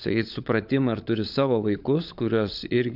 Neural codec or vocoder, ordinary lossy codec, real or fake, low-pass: codec, 16 kHz, 16 kbps, FunCodec, trained on LibriTTS, 50 frames a second; AAC, 48 kbps; fake; 5.4 kHz